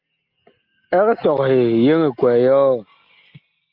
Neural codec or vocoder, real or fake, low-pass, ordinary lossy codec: none; real; 5.4 kHz; Opus, 24 kbps